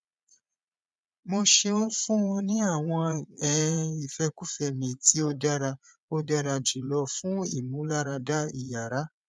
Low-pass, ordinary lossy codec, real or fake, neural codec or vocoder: none; none; fake; vocoder, 22.05 kHz, 80 mel bands, Vocos